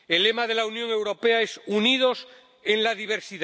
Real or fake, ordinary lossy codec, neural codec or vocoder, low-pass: real; none; none; none